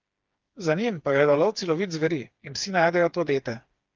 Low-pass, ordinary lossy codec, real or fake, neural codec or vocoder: 7.2 kHz; Opus, 24 kbps; fake; codec, 16 kHz, 4 kbps, FreqCodec, smaller model